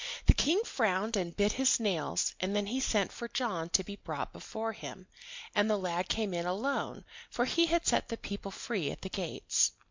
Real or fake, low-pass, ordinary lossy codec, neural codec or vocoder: real; 7.2 kHz; MP3, 64 kbps; none